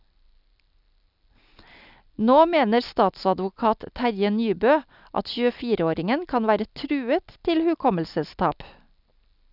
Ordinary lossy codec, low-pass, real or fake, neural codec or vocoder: none; 5.4 kHz; real; none